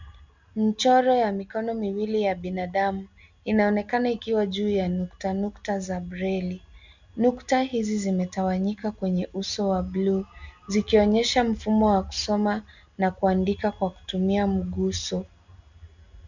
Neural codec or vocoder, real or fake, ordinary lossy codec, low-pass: none; real; Opus, 64 kbps; 7.2 kHz